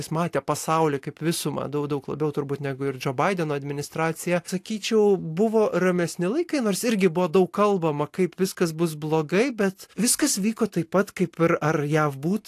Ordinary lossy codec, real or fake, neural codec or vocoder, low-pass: AAC, 64 kbps; real; none; 14.4 kHz